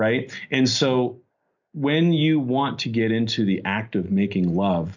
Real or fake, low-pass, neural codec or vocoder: real; 7.2 kHz; none